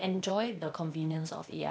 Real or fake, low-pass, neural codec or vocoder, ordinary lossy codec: fake; none; codec, 16 kHz, 0.8 kbps, ZipCodec; none